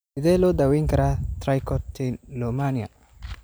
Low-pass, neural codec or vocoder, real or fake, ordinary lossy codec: none; none; real; none